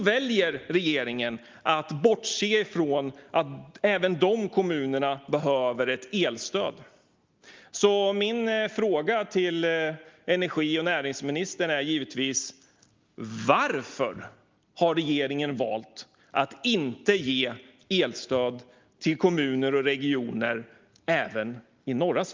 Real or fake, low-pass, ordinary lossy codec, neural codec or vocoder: real; 7.2 kHz; Opus, 32 kbps; none